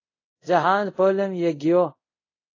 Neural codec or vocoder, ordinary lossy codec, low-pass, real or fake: codec, 24 kHz, 0.5 kbps, DualCodec; AAC, 32 kbps; 7.2 kHz; fake